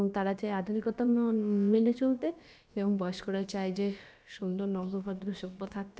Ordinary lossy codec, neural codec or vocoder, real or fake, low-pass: none; codec, 16 kHz, about 1 kbps, DyCAST, with the encoder's durations; fake; none